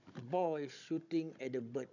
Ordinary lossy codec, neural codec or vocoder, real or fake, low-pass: none; codec, 16 kHz, 16 kbps, FunCodec, trained on Chinese and English, 50 frames a second; fake; 7.2 kHz